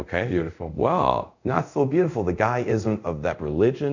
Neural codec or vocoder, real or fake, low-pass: codec, 24 kHz, 0.5 kbps, DualCodec; fake; 7.2 kHz